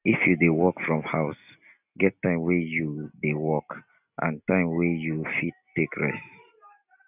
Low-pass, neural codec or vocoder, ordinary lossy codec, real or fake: 3.6 kHz; none; none; real